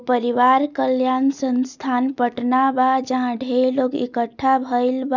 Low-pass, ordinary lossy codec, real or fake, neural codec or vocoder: 7.2 kHz; none; real; none